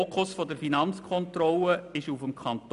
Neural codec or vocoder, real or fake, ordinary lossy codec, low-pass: none; real; none; 10.8 kHz